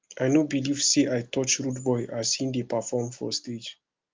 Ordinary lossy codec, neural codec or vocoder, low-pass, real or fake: Opus, 32 kbps; none; 7.2 kHz; real